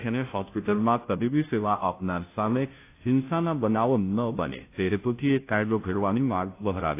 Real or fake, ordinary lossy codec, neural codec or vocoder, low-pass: fake; AAC, 24 kbps; codec, 16 kHz, 0.5 kbps, FunCodec, trained on Chinese and English, 25 frames a second; 3.6 kHz